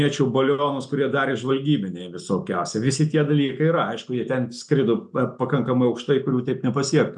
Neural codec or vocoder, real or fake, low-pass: none; real; 10.8 kHz